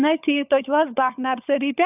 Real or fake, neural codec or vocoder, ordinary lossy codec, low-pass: fake; codec, 16 kHz, 16 kbps, FunCodec, trained on LibriTTS, 50 frames a second; AAC, 32 kbps; 3.6 kHz